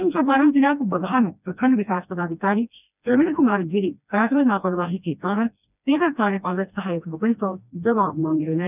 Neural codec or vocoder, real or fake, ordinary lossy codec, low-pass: codec, 16 kHz, 1 kbps, FreqCodec, smaller model; fake; none; 3.6 kHz